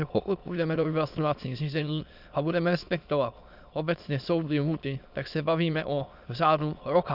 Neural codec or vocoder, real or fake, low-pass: autoencoder, 22.05 kHz, a latent of 192 numbers a frame, VITS, trained on many speakers; fake; 5.4 kHz